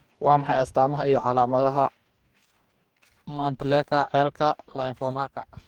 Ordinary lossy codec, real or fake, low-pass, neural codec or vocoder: Opus, 32 kbps; fake; 19.8 kHz; codec, 44.1 kHz, 2.6 kbps, DAC